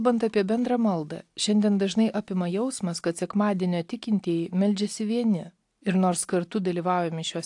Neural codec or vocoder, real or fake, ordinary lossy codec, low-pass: none; real; AAC, 64 kbps; 10.8 kHz